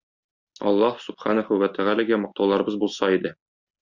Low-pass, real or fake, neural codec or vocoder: 7.2 kHz; real; none